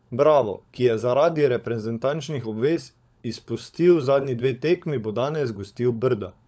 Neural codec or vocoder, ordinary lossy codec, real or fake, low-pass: codec, 16 kHz, 16 kbps, FunCodec, trained on LibriTTS, 50 frames a second; none; fake; none